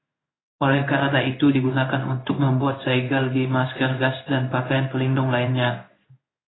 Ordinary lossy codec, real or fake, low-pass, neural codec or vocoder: AAC, 16 kbps; fake; 7.2 kHz; codec, 16 kHz in and 24 kHz out, 1 kbps, XY-Tokenizer